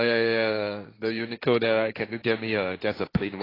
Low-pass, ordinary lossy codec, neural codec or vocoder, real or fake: 5.4 kHz; AAC, 24 kbps; codec, 16 kHz, 1.1 kbps, Voila-Tokenizer; fake